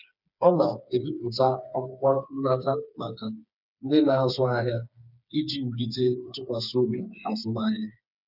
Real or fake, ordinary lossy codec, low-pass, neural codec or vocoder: fake; none; 5.4 kHz; codec, 16 kHz, 4 kbps, FreqCodec, smaller model